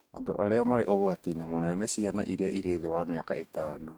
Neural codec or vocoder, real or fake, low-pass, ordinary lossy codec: codec, 44.1 kHz, 2.6 kbps, DAC; fake; none; none